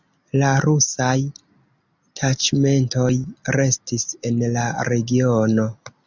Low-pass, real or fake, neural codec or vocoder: 7.2 kHz; real; none